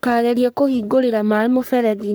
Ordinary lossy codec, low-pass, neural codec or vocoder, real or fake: none; none; codec, 44.1 kHz, 3.4 kbps, Pupu-Codec; fake